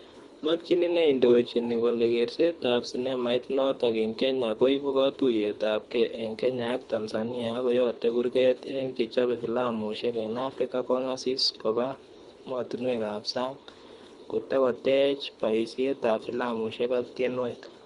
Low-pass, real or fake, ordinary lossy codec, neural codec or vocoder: 10.8 kHz; fake; Opus, 64 kbps; codec, 24 kHz, 3 kbps, HILCodec